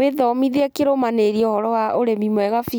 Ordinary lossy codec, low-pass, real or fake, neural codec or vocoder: none; none; real; none